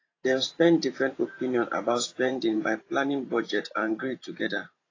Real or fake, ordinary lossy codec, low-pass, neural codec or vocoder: fake; AAC, 32 kbps; 7.2 kHz; vocoder, 44.1 kHz, 128 mel bands every 512 samples, BigVGAN v2